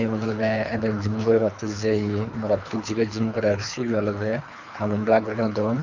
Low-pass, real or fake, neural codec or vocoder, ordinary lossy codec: 7.2 kHz; fake; codec, 24 kHz, 3 kbps, HILCodec; none